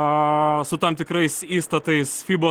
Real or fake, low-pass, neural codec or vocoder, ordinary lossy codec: real; 19.8 kHz; none; Opus, 16 kbps